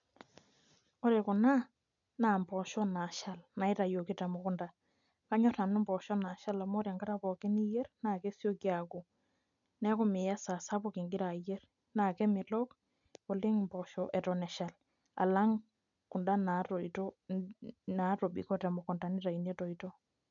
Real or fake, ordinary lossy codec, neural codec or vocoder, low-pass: real; none; none; 7.2 kHz